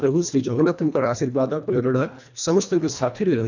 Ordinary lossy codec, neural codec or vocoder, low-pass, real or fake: none; codec, 24 kHz, 1.5 kbps, HILCodec; 7.2 kHz; fake